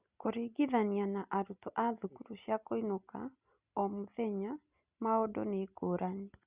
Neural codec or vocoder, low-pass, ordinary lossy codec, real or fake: none; 3.6 kHz; Opus, 64 kbps; real